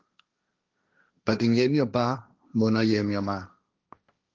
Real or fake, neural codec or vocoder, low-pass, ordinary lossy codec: fake; codec, 16 kHz, 1.1 kbps, Voila-Tokenizer; 7.2 kHz; Opus, 32 kbps